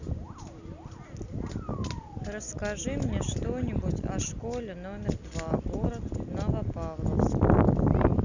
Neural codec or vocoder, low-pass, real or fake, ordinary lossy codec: none; 7.2 kHz; real; none